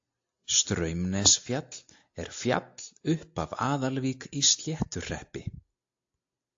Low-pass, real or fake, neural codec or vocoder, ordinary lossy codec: 7.2 kHz; real; none; AAC, 48 kbps